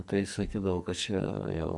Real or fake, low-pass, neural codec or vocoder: fake; 10.8 kHz; codec, 44.1 kHz, 2.6 kbps, SNAC